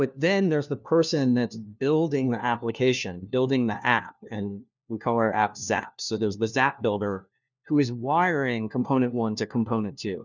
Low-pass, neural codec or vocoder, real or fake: 7.2 kHz; codec, 16 kHz, 1 kbps, FunCodec, trained on LibriTTS, 50 frames a second; fake